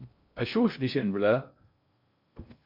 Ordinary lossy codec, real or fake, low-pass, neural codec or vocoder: MP3, 48 kbps; fake; 5.4 kHz; codec, 16 kHz in and 24 kHz out, 0.6 kbps, FocalCodec, streaming, 2048 codes